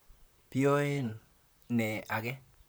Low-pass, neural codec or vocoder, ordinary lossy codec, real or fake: none; vocoder, 44.1 kHz, 128 mel bands, Pupu-Vocoder; none; fake